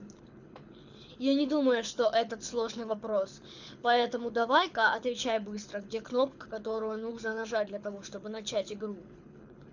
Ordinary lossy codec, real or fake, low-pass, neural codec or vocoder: none; fake; 7.2 kHz; codec, 24 kHz, 6 kbps, HILCodec